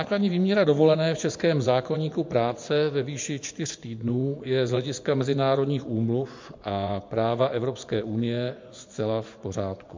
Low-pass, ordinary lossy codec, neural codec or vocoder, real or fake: 7.2 kHz; MP3, 48 kbps; vocoder, 24 kHz, 100 mel bands, Vocos; fake